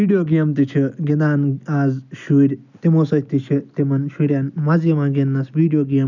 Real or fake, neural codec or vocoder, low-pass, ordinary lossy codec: real; none; 7.2 kHz; none